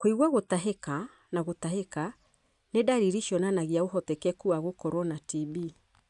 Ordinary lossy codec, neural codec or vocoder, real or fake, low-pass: none; none; real; 10.8 kHz